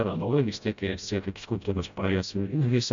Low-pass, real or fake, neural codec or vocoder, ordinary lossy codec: 7.2 kHz; fake; codec, 16 kHz, 0.5 kbps, FreqCodec, smaller model; MP3, 64 kbps